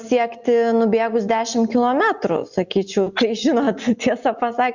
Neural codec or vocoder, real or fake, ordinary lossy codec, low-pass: none; real; Opus, 64 kbps; 7.2 kHz